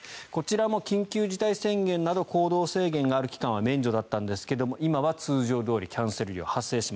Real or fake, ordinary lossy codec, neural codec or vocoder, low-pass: real; none; none; none